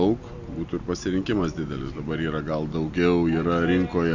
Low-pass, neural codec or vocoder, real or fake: 7.2 kHz; none; real